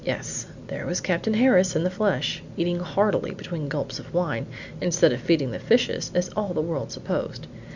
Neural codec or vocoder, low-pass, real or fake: none; 7.2 kHz; real